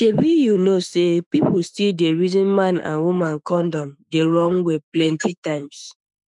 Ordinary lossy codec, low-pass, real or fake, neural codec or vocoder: none; 10.8 kHz; fake; autoencoder, 48 kHz, 32 numbers a frame, DAC-VAE, trained on Japanese speech